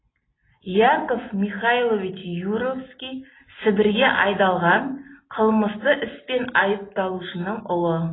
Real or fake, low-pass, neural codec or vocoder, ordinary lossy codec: real; 7.2 kHz; none; AAC, 16 kbps